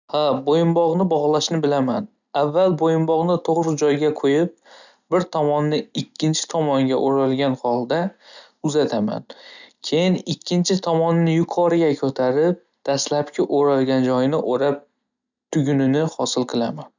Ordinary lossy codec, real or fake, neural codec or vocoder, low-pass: none; real; none; 7.2 kHz